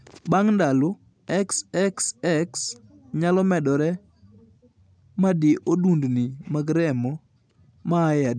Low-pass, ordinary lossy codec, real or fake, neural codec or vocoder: 9.9 kHz; none; real; none